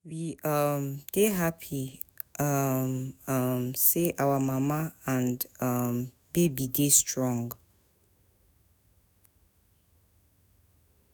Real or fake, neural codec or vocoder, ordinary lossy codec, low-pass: fake; autoencoder, 48 kHz, 128 numbers a frame, DAC-VAE, trained on Japanese speech; none; none